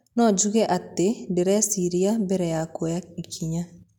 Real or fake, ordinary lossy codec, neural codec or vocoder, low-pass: real; none; none; 19.8 kHz